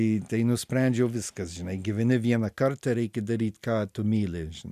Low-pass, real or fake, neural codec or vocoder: 14.4 kHz; real; none